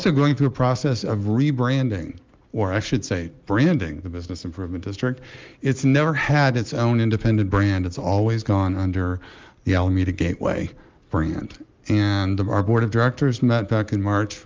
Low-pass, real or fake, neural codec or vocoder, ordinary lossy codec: 7.2 kHz; real; none; Opus, 32 kbps